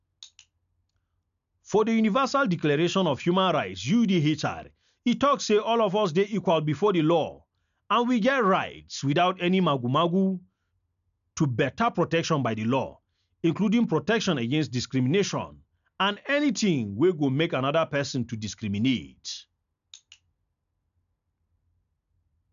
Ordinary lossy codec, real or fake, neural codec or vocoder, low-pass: none; real; none; 7.2 kHz